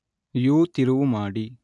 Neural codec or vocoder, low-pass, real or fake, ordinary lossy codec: none; 10.8 kHz; real; none